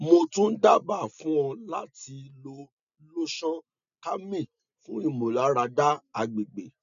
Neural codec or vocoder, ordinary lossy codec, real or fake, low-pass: none; none; real; 7.2 kHz